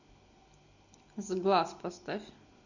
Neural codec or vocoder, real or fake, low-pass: none; real; 7.2 kHz